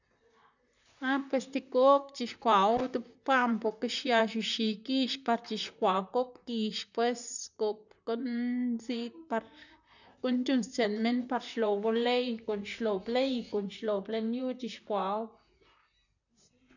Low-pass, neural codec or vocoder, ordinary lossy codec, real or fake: 7.2 kHz; vocoder, 44.1 kHz, 128 mel bands, Pupu-Vocoder; none; fake